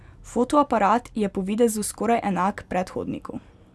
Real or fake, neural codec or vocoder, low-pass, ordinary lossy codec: real; none; none; none